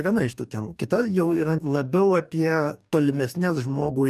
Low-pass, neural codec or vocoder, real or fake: 14.4 kHz; codec, 44.1 kHz, 2.6 kbps, DAC; fake